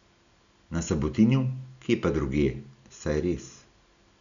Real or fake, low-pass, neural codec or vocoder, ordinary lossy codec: real; 7.2 kHz; none; none